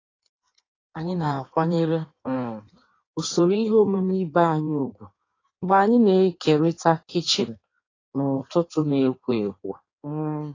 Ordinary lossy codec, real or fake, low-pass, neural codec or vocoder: AAC, 32 kbps; fake; 7.2 kHz; codec, 16 kHz in and 24 kHz out, 1.1 kbps, FireRedTTS-2 codec